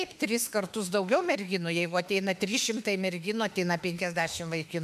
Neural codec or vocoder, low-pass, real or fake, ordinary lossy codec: autoencoder, 48 kHz, 32 numbers a frame, DAC-VAE, trained on Japanese speech; 14.4 kHz; fake; MP3, 96 kbps